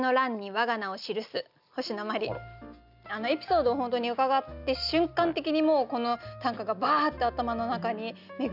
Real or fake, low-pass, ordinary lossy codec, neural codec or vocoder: real; 5.4 kHz; none; none